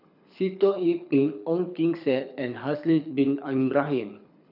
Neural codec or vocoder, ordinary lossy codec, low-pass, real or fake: codec, 24 kHz, 6 kbps, HILCodec; none; 5.4 kHz; fake